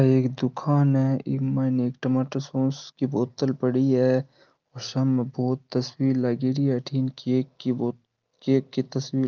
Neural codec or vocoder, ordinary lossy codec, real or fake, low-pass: none; Opus, 24 kbps; real; 7.2 kHz